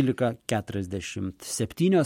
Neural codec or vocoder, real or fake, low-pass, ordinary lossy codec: none; real; 19.8 kHz; MP3, 64 kbps